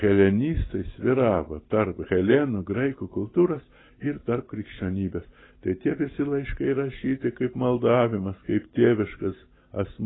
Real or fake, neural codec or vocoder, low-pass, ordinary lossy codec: real; none; 7.2 kHz; AAC, 16 kbps